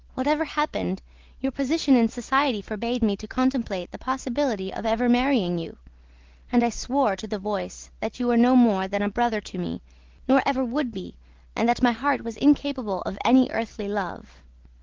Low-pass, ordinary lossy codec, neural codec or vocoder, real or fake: 7.2 kHz; Opus, 16 kbps; none; real